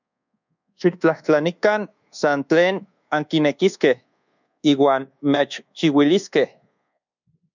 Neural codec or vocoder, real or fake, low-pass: codec, 24 kHz, 1.2 kbps, DualCodec; fake; 7.2 kHz